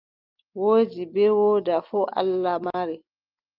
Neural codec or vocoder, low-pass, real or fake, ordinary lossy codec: none; 5.4 kHz; real; Opus, 32 kbps